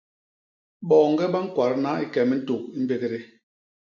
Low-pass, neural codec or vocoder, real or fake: 7.2 kHz; none; real